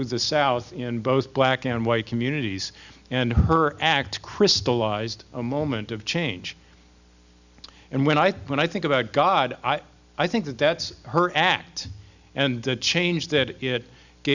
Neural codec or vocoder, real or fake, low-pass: none; real; 7.2 kHz